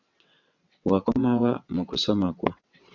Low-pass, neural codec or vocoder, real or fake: 7.2 kHz; vocoder, 22.05 kHz, 80 mel bands, WaveNeXt; fake